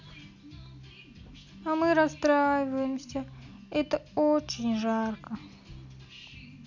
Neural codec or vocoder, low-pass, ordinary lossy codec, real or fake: none; 7.2 kHz; none; real